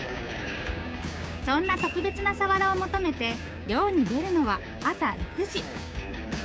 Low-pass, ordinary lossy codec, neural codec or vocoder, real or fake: none; none; codec, 16 kHz, 6 kbps, DAC; fake